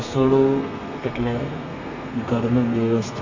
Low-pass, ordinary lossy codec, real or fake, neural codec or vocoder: 7.2 kHz; MP3, 48 kbps; fake; codec, 32 kHz, 1.9 kbps, SNAC